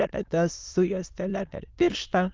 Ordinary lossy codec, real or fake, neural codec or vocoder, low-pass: Opus, 24 kbps; fake; autoencoder, 22.05 kHz, a latent of 192 numbers a frame, VITS, trained on many speakers; 7.2 kHz